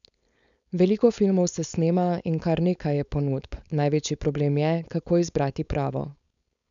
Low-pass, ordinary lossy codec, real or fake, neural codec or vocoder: 7.2 kHz; none; fake; codec, 16 kHz, 4.8 kbps, FACodec